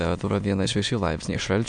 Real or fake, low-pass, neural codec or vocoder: fake; 9.9 kHz; autoencoder, 22.05 kHz, a latent of 192 numbers a frame, VITS, trained on many speakers